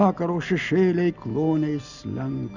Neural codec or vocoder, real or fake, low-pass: none; real; 7.2 kHz